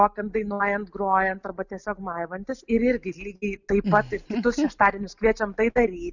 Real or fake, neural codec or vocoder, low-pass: real; none; 7.2 kHz